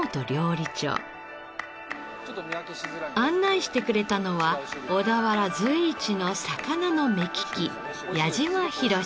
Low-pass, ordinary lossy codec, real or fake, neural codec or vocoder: none; none; real; none